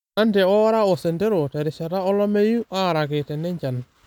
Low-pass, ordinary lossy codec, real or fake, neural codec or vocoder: 19.8 kHz; none; real; none